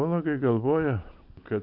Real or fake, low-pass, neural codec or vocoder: real; 5.4 kHz; none